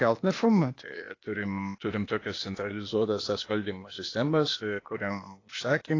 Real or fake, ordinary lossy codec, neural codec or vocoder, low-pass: fake; AAC, 32 kbps; codec, 16 kHz, 0.8 kbps, ZipCodec; 7.2 kHz